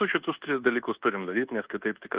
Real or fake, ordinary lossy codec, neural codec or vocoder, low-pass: fake; Opus, 16 kbps; codec, 24 kHz, 1.2 kbps, DualCodec; 3.6 kHz